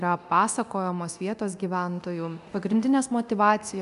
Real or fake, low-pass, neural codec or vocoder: fake; 10.8 kHz; codec, 24 kHz, 0.9 kbps, DualCodec